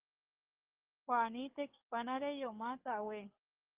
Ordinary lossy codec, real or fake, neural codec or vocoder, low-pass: Opus, 32 kbps; fake; codec, 44.1 kHz, 7.8 kbps, DAC; 3.6 kHz